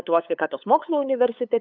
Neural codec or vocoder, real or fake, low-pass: codec, 16 kHz, 8 kbps, FunCodec, trained on LibriTTS, 25 frames a second; fake; 7.2 kHz